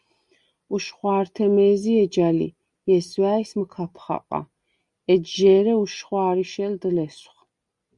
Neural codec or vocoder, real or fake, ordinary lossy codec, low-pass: none; real; Opus, 64 kbps; 10.8 kHz